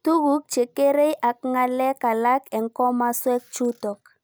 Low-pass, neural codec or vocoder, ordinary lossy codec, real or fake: none; none; none; real